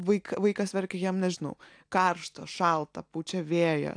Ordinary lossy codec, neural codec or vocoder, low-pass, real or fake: AAC, 64 kbps; none; 9.9 kHz; real